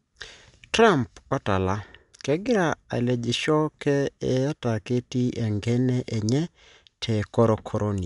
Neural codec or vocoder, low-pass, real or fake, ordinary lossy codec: none; 10.8 kHz; real; none